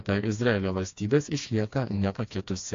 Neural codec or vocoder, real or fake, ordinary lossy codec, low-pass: codec, 16 kHz, 2 kbps, FreqCodec, smaller model; fake; AAC, 48 kbps; 7.2 kHz